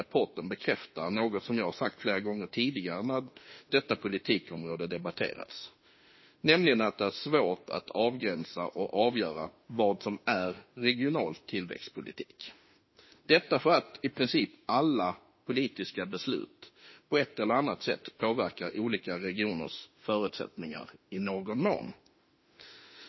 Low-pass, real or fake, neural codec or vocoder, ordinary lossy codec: 7.2 kHz; fake; autoencoder, 48 kHz, 32 numbers a frame, DAC-VAE, trained on Japanese speech; MP3, 24 kbps